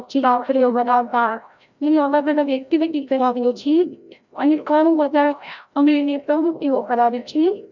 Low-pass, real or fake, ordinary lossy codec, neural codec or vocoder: 7.2 kHz; fake; none; codec, 16 kHz, 0.5 kbps, FreqCodec, larger model